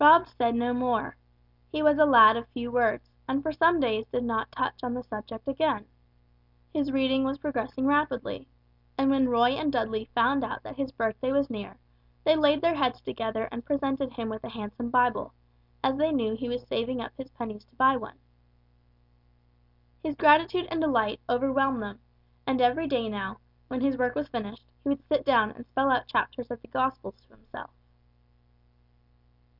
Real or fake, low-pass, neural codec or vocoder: real; 5.4 kHz; none